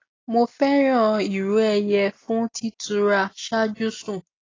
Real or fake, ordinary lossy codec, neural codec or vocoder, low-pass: real; AAC, 32 kbps; none; 7.2 kHz